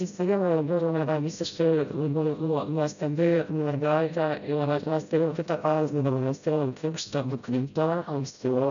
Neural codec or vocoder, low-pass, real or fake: codec, 16 kHz, 0.5 kbps, FreqCodec, smaller model; 7.2 kHz; fake